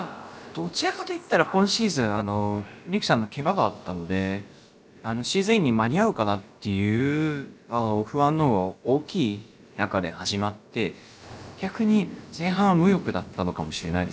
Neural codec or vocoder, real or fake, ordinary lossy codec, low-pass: codec, 16 kHz, about 1 kbps, DyCAST, with the encoder's durations; fake; none; none